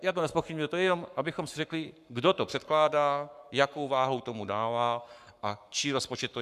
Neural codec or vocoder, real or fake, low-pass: codec, 44.1 kHz, 7.8 kbps, Pupu-Codec; fake; 14.4 kHz